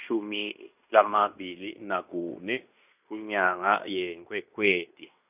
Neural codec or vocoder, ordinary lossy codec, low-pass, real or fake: codec, 16 kHz in and 24 kHz out, 0.9 kbps, LongCat-Audio-Codec, fine tuned four codebook decoder; none; 3.6 kHz; fake